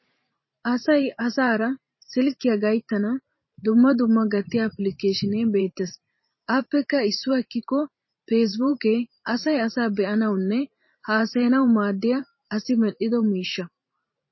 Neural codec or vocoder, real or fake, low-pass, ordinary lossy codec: vocoder, 44.1 kHz, 128 mel bands every 512 samples, BigVGAN v2; fake; 7.2 kHz; MP3, 24 kbps